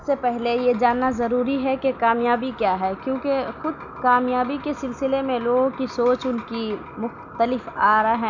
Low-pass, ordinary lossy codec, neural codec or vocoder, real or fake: 7.2 kHz; none; none; real